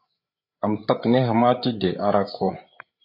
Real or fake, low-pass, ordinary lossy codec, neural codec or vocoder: fake; 5.4 kHz; AAC, 48 kbps; codec, 16 kHz, 8 kbps, FreqCodec, larger model